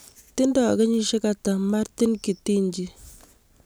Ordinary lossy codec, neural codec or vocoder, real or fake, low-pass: none; none; real; none